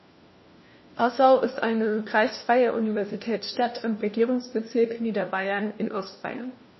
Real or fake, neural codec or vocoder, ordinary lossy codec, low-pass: fake; codec, 16 kHz, 1 kbps, FunCodec, trained on LibriTTS, 50 frames a second; MP3, 24 kbps; 7.2 kHz